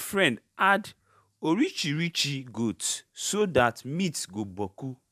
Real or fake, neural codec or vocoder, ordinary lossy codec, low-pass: fake; vocoder, 44.1 kHz, 128 mel bands every 512 samples, BigVGAN v2; none; 14.4 kHz